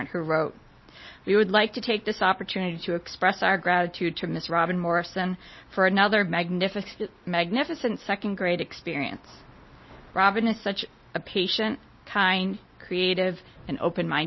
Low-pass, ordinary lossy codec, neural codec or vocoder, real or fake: 7.2 kHz; MP3, 24 kbps; none; real